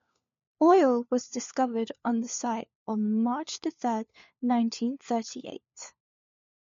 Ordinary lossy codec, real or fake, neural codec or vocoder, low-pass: MP3, 48 kbps; fake; codec, 16 kHz, 4 kbps, FunCodec, trained on LibriTTS, 50 frames a second; 7.2 kHz